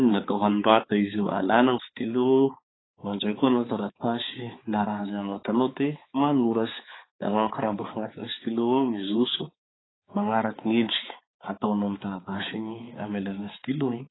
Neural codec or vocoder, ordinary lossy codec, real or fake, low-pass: codec, 16 kHz, 4 kbps, X-Codec, HuBERT features, trained on balanced general audio; AAC, 16 kbps; fake; 7.2 kHz